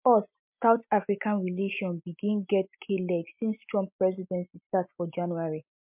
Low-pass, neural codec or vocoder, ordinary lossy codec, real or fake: 3.6 kHz; none; MP3, 32 kbps; real